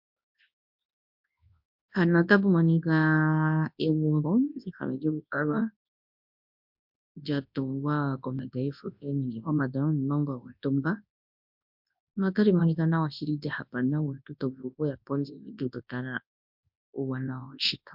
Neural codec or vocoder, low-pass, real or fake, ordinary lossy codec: codec, 24 kHz, 0.9 kbps, WavTokenizer, large speech release; 5.4 kHz; fake; MP3, 48 kbps